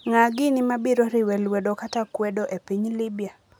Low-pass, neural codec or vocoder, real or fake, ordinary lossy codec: none; none; real; none